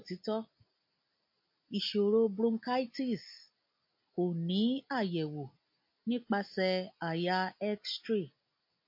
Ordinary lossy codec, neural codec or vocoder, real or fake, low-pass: MP3, 32 kbps; none; real; 5.4 kHz